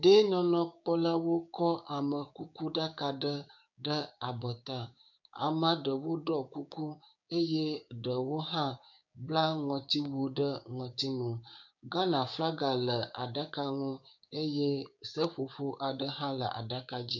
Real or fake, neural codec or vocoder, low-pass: fake; codec, 16 kHz, 6 kbps, DAC; 7.2 kHz